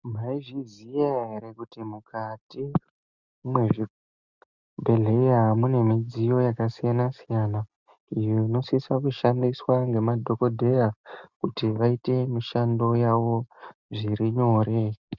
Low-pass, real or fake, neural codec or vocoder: 7.2 kHz; real; none